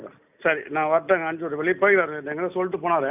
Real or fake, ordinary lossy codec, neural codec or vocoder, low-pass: real; none; none; 3.6 kHz